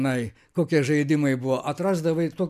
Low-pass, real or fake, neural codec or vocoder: 14.4 kHz; real; none